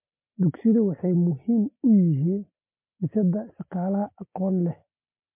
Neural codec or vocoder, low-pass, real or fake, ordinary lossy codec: none; 3.6 kHz; real; MP3, 32 kbps